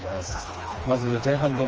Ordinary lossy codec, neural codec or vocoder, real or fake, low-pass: Opus, 24 kbps; codec, 16 kHz, 2 kbps, FreqCodec, smaller model; fake; 7.2 kHz